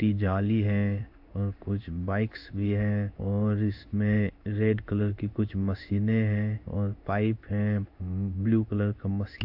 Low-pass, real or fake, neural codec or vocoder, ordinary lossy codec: 5.4 kHz; fake; codec, 16 kHz in and 24 kHz out, 1 kbps, XY-Tokenizer; AAC, 48 kbps